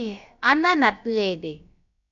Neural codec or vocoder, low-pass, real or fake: codec, 16 kHz, about 1 kbps, DyCAST, with the encoder's durations; 7.2 kHz; fake